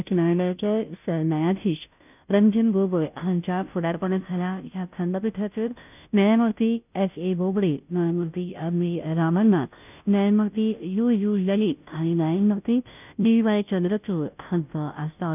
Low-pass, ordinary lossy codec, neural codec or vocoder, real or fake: 3.6 kHz; none; codec, 16 kHz, 0.5 kbps, FunCodec, trained on Chinese and English, 25 frames a second; fake